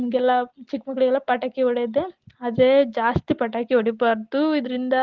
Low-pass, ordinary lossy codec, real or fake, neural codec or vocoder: 7.2 kHz; Opus, 16 kbps; real; none